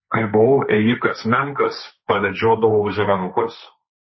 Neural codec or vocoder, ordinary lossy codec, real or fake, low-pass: codec, 16 kHz, 1.1 kbps, Voila-Tokenizer; MP3, 24 kbps; fake; 7.2 kHz